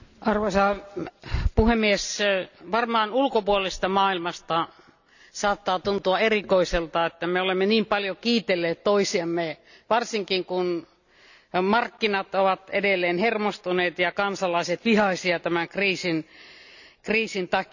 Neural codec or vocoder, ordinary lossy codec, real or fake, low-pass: none; none; real; 7.2 kHz